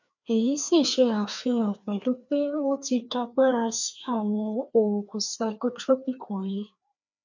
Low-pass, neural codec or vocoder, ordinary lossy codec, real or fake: 7.2 kHz; codec, 16 kHz, 2 kbps, FreqCodec, larger model; none; fake